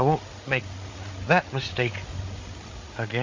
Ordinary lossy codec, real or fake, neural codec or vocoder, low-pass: MP3, 32 kbps; fake; codec, 16 kHz, 8 kbps, FreqCodec, larger model; 7.2 kHz